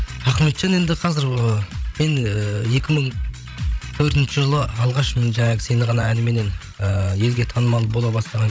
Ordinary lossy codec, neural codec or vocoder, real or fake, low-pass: none; codec, 16 kHz, 16 kbps, FreqCodec, larger model; fake; none